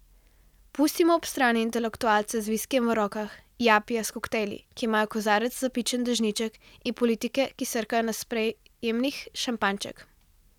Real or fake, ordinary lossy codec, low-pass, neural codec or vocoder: real; none; 19.8 kHz; none